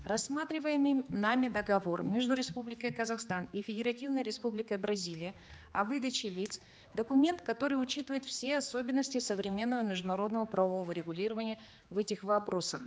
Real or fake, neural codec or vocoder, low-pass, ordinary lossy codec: fake; codec, 16 kHz, 2 kbps, X-Codec, HuBERT features, trained on general audio; none; none